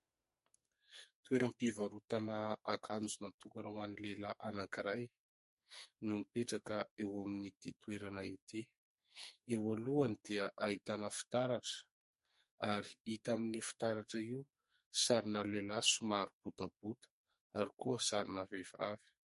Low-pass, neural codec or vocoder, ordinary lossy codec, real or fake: 14.4 kHz; codec, 44.1 kHz, 2.6 kbps, SNAC; MP3, 48 kbps; fake